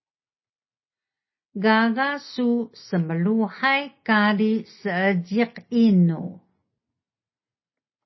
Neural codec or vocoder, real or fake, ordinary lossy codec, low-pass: none; real; MP3, 24 kbps; 7.2 kHz